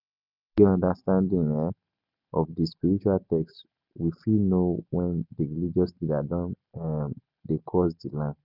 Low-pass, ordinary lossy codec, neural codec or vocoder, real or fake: 5.4 kHz; none; none; real